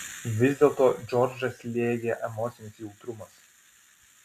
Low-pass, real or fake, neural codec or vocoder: 14.4 kHz; real; none